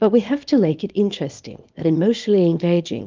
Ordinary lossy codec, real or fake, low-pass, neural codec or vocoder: Opus, 32 kbps; fake; 7.2 kHz; codec, 24 kHz, 0.9 kbps, WavTokenizer, small release